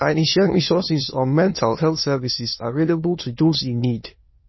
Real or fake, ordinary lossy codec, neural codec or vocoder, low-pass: fake; MP3, 24 kbps; autoencoder, 22.05 kHz, a latent of 192 numbers a frame, VITS, trained on many speakers; 7.2 kHz